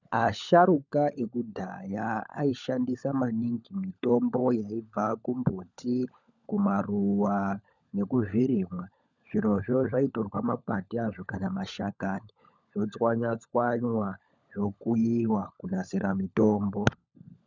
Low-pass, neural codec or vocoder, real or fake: 7.2 kHz; codec, 16 kHz, 16 kbps, FunCodec, trained on LibriTTS, 50 frames a second; fake